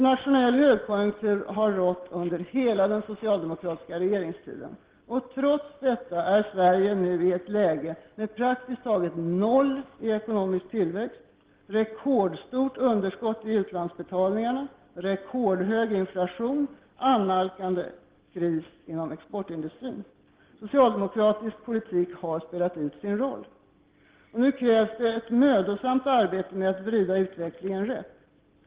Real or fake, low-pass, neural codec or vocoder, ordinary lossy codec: real; 3.6 kHz; none; Opus, 16 kbps